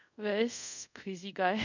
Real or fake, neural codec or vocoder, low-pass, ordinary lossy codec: fake; codec, 24 kHz, 0.5 kbps, DualCodec; 7.2 kHz; none